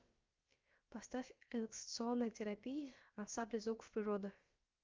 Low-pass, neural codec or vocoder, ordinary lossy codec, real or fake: 7.2 kHz; codec, 16 kHz, about 1 kbps, DyCAST, with the encoder's durations; Opus, 24 kbps; fake